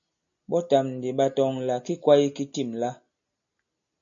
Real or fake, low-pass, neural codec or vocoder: real; 7.2 kHz; none